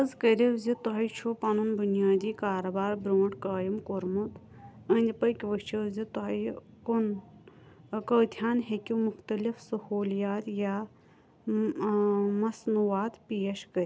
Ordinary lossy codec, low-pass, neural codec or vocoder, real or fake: none; none; none; real